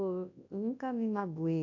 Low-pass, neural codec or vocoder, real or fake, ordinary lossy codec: 7.2 kHz; codec, 24 kHz, 0.9 kbps, WavTokenizer, large speech release; fake; AAC, 48 kbps